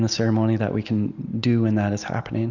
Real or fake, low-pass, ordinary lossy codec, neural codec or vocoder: real; 7.2 kHz; Opus, 64 kbps; none